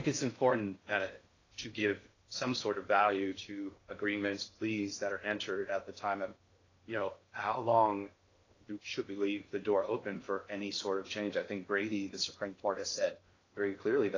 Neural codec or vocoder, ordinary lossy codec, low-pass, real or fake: codec, 16 kHz in and 24 kHz out, 0.6 kbps, FocalCodec, streaming, 4096 codes; AAC, 32 kbps; 7.2 kHz; fake